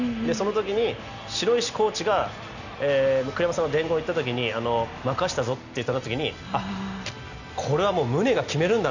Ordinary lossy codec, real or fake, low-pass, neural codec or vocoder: none; real; 7.2 kHz; none